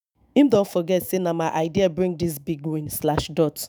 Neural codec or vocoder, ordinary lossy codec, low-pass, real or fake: autoencoder, 48 kHz, 128 numbers a frame, DAC-VAE, trained on Japanese speech; none; none; fake